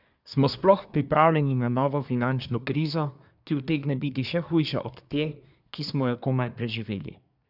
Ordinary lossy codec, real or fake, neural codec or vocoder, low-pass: none; fake; codec, 24 kHz, 1 kbps, SNAC; 5.4 kHz